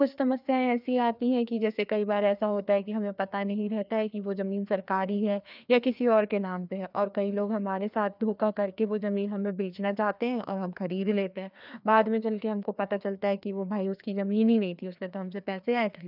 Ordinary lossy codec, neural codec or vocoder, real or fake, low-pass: none; codec, 16 kHz, 2 kbps, FreqCodec, larger model; fake; 5.4 kHz